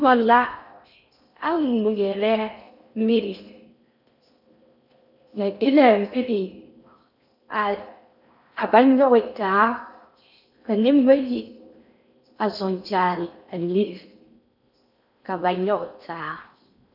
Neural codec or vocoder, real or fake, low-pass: codec, 16 kHz in and 24 kHz out, 0.6 kbps, FocalCodec, streaming, 4096 codes; fake; 5.4 kHz